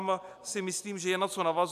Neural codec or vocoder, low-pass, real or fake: none; 14.4 kHz; real